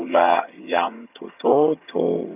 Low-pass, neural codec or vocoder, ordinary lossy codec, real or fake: 3.6 kHz; vocoder, 22.05 kHz, 80 mel bands, HiFi-GAN; none; fake